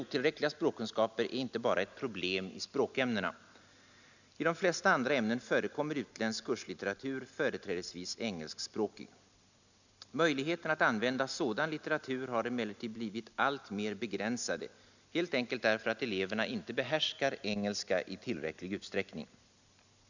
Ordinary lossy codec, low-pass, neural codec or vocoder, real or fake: none; 7.2 kHz; none; real